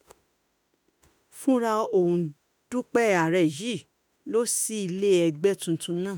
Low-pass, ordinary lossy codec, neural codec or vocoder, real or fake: none; none; autoencoder, 48 kHz, 32 numbers a frame, DAC-VAE, trained on Japanese speech; fake